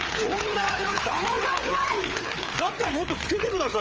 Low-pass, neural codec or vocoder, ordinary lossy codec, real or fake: 7.2 kHz; codec, 16 kHz, 4 kbps, FreqCodec, larger model; Opus, 24 kbps; fake